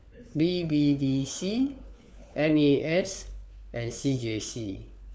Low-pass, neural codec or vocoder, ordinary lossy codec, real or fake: none; codec, 16 kHz, 4 kbps, FunCodec, trained on LibriTTS, 50 frames a second; none; fake